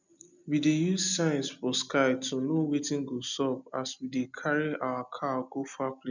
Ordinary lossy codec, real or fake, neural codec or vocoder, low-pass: none; real; none; 7.2 kHz